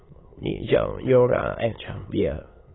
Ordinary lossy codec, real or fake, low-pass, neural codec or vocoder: AAC, 16 kbps; fake; 7.2 kHz; autoencoder, 22.05 kHz, a latent of 192 numbers a frame, VITS, trained on many speakers